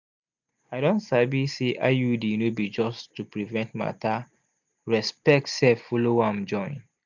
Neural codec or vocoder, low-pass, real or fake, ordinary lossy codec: none; 7.2 kHz; real; none